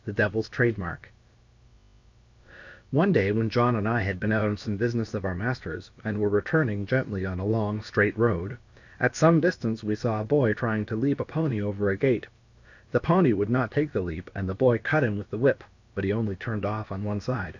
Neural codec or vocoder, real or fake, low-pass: codec, 16 kHz, about 1 kbps, DyCAST, with the encoder's durations; fake; 7.2 kHz